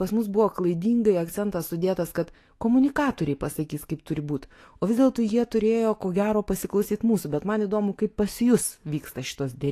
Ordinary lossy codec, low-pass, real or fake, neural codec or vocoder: AAC, 48 kbps; 14.4 kHz; fake; autoencoder, 48 kHz, 128 numbers a frame, DAC-VAE, trained on Japanese speech